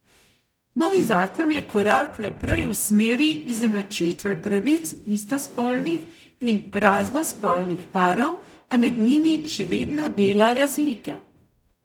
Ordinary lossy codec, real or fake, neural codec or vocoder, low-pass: none; fake; codec, 44.1 kHz, 0.9 kbps, DAC; 19.8 kHz